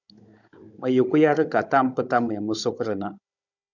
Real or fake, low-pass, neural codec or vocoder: fake; 7.2 kHz; codec, 16 kHz, 16 kbps, FunCodec, trained on Chinese and English, 50 frames a second